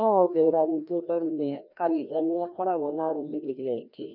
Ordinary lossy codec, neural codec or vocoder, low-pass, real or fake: none; codec, 16 kHz, 1 kbps, FreqCodec, larger model; 5.4 kHz; fake